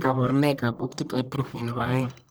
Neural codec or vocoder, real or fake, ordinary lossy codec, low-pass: codec, 44.1 kHz, 1.7 kbps, Pupu-Codec; fake; none; none